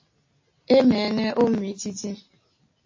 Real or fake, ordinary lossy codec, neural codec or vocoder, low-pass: real; MP3, 32 kbps; none; 7.2 kHz